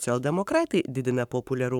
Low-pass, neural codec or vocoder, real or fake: 19.8 kHz; codec, 44.1 kHz, 7.8 kbps, Pupu-Codec; fake